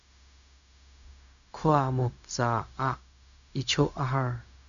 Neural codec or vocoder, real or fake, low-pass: codec, 16 kHz, 0.4 kbps, LongCat-Audio-Codec; fake; 7.2 kHz